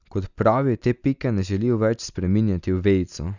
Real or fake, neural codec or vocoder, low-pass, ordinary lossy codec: real; none; 7.2 kHz; none